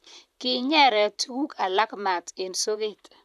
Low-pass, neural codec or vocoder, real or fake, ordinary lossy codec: 14.4 kHz; vocoder, 44.1 kHz, 128 mel bands, Pupu-Vocoder; fake; none